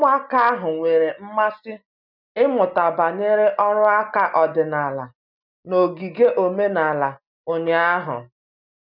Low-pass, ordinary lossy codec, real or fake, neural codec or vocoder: 5.4 kHz; none; real; none